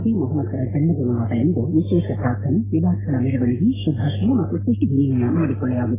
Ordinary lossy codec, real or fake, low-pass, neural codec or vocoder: AAC, 16 kbps; fake; 3.6 kHz; codec, 44.1 kHz, 3.4 kbps, Pupu-Codec